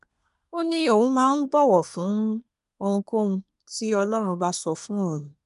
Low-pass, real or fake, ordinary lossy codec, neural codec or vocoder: 10.8 kHz; fake; none; codec, 24 kHz, 1 kbps, SNAC